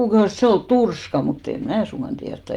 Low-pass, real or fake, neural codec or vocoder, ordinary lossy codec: 19.8 kHz; real; none; Opus, 32 kbps